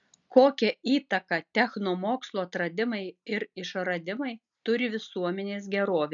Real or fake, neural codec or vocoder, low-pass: real; none; 7.2 kHz